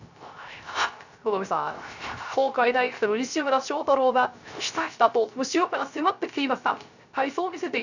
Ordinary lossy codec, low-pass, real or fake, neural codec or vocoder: none; 7.2 kHz; fake; codec, 16 kHz, 0.3 kbps, FocalCodec